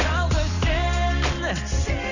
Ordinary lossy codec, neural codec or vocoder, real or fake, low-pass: none; none; real; 7.2 kHz